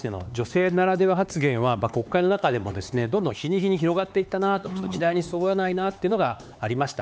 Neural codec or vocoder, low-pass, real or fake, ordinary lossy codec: codec, 16 kHz, 4 kbps, X-Codec, HuBERT features, trained on LibriSpeech; none; fake; none